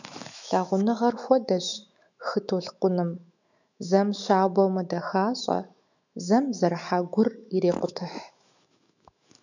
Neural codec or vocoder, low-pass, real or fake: autoencoder, 48 kHz, 128 numbers a frame, DAC-VAE, trained on Japanese speech; 7.2 kHz; fake